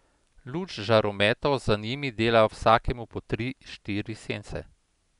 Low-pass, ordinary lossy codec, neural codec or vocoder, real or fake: 10.8 kHz; none; none; real